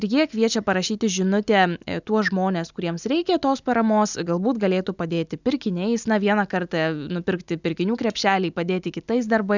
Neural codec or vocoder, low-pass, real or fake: none; 7.2 kHz; real